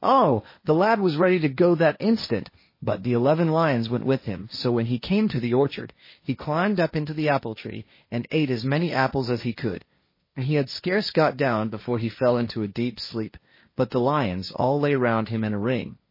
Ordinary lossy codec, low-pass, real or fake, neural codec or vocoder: MP3, 24 kbps; 5.4 kHz; fake; codec, 16 kHz, 1.1 kbps, Voila-Tokenizer